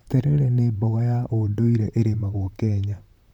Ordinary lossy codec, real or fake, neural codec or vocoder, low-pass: none; fake; vocoder, 44.1 kHz, 128 mel bands, Pupu-Vocoder; 19.8 kHz